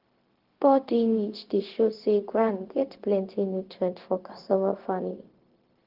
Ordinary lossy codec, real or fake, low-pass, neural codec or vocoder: Opus, 16 kbps; fake; 5.4 kHz; codec, 16 kHz, 0.4 kbps, LongCat-Audio-Codec